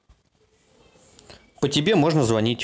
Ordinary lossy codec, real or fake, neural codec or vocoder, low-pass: none; real; none; none